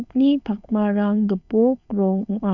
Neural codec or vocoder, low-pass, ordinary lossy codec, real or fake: codec, 16 kHz, 4.8 kbps, FACodec; 7.2 kHz; none; fake